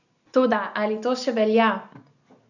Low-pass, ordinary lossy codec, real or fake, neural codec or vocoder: 7.2 kHz; none; real; none